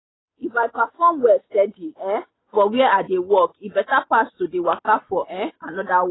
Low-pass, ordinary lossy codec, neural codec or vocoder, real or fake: 7.2 kHz; AAC, 16 kbps; none; real